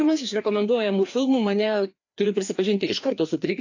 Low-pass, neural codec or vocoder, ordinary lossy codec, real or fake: 7.2 kHz; codec, 16 kHz, 2 kbps, FreqCodec, larger model; AAC, 48 kbps; fake